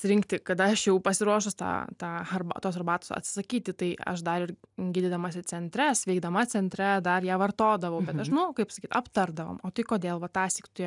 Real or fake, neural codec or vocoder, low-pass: real; none; 10.8 kHz